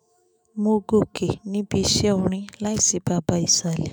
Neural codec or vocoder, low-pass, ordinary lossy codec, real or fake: autoencoder, 48 kHz, 128 numbers a frame, DAC-VAE, trained on Japanese speech; none; none; fake